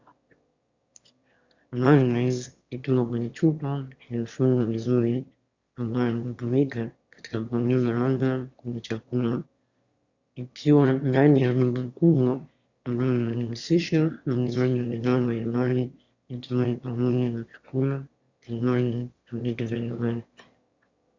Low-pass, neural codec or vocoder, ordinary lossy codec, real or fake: 7.2 kHz; autoencoder, 22.05 kHz, a latent of 192 numbers a frame, VITS, trained on one speaker; Opus, 64 kbps; fake